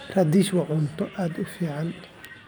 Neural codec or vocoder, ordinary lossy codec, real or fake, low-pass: vocoder, 44.1 kHz, 128 mel bands every 512 samples, BigVGAN v2; none; fake; none